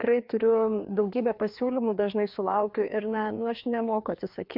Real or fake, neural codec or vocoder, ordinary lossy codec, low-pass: fake; codec, 16 kHz, 2 kbps, FreqCodec, larger model; MP3, 48 kbps; 5.4 kHz